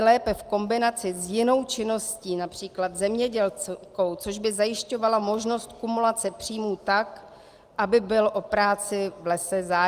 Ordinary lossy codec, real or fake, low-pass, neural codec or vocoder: Opus, 24 kbps; real; 14.4 kHz; none